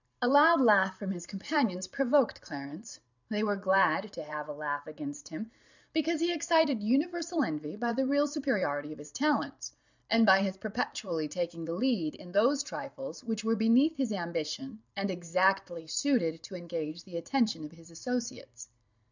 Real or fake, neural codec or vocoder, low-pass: fake; vocoder, 44.1 kHz, 128 mel bands every 512 samples, BigVGAN v2; 7.2 kHz